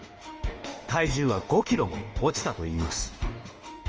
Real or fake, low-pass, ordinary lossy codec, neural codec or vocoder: fake; 7.2 kHz; Opus, 24 kbps; autoencoder, 48 kHz, 32 numbers a frame, DAC-VAE, trained on Japanese speech